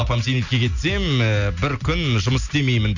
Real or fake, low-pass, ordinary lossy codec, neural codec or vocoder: real; 7.2 kHz; none; none